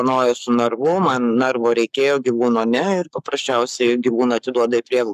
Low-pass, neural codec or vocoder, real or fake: 14.4 kHz; codec, 44.1 kHz, 7.8 kbps, Pupu-Codec; fake